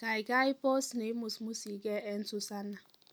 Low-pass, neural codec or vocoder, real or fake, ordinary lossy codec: none; none; real; none